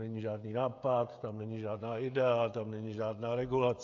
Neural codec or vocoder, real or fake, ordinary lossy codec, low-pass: codec, 16 kHz, 16 kbps, FreqCodec, smaller model; fake; AAC, 64 kbps; 7.2 kHz